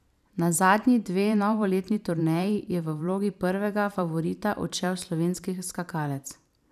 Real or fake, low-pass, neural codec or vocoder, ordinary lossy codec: fake; 14.4 kHz; vocoder, 44.1 kHz, 128 mel bands every 512 samples, BigVGAN v2; none